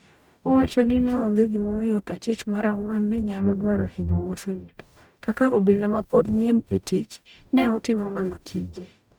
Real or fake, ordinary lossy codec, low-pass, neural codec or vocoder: fake; none; 19.8 kHz; codec, 44.1 kHz, 0.9 kbps, DAC